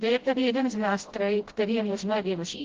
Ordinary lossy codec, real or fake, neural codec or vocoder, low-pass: Opus, 16 kbps; fake; codec, 16 kHz, 0.5 kbps, FreqCodec, smaller model; 7.2 kHz